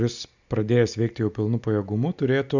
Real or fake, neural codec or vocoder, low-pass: real; none; 7.2 kHz